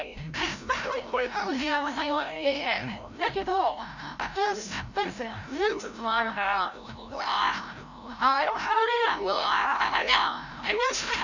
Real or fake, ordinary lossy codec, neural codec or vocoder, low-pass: fake; none; codec, 16 kHz, 0.5 kbps, FreqCodec, larger model; 7.2 kHz